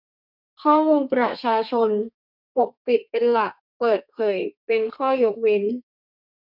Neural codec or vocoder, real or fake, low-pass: codec, 32 kHz, 1.9 kbps, SNAC; fake; 5.4 kHz